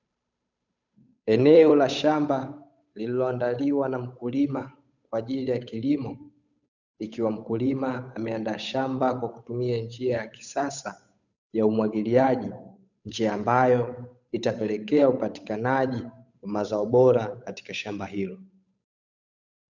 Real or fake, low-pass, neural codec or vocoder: fake; 7.2 kHz; codec, 16 kHz, 8 kbps, FunCodec, trained on Chinese and English, 25 frames a second